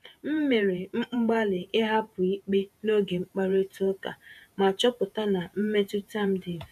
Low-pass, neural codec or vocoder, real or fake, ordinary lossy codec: 14.4 kHz; vocoder, 48 kHz, 128 mel bands, Vocos; fake; MP3, 96 kbps